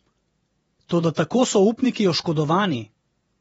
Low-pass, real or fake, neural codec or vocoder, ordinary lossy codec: 19.8 kHz; fake; vocoder, 48 kHz, 128 mel bands, Vocos; AAC, 24 kbps